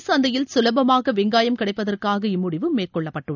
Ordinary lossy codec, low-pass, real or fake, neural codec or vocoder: none; 7.2 kHz; real; none